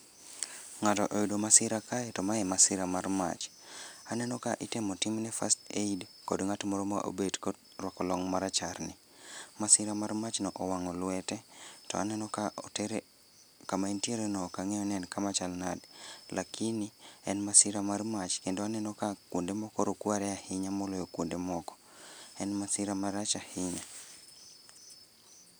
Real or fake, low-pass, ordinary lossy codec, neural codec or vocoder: real; none; none; none